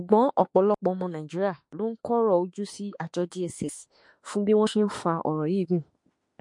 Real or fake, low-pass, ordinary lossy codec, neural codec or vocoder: fake; 10.8 kHz; MP3, 48 kbps; autoencoder, 48 kHz, 32 numbers a frame, DAC-VAE, trained on Japanese speech